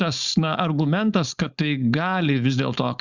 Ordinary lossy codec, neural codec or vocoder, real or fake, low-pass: Opus, 64 kbps; codec, 16 kHz, 4.8 kbps, FACodec; fake; 7.2 kHz